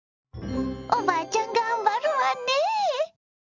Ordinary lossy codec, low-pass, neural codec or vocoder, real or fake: none; 7.2 kHz; none; real